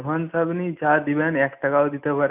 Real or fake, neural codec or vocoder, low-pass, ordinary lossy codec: real; none; 3.6 kHz; none